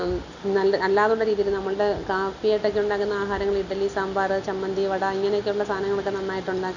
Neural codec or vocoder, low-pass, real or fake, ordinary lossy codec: none; 7.2 kHz; real; none